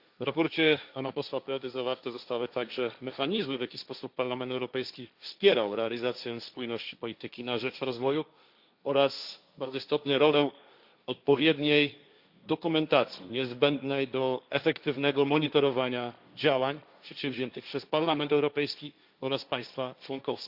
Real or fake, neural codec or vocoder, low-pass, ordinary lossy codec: fake; codec, 16 kHz, 1.1 kbps, Voila-Tokenizer; 5.4 kHz; Opus, 64 kbps